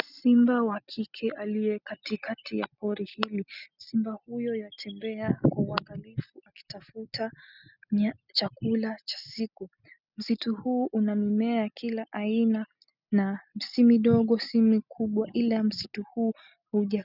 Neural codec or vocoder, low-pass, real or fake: none; 5.4 kHz; real